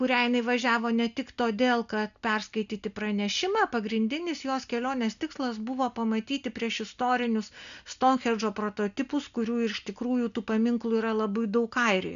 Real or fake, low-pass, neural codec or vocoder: real; 7.2 kHz; none